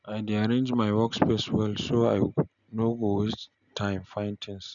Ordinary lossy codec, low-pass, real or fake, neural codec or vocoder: none; 7.2 kHz; real; none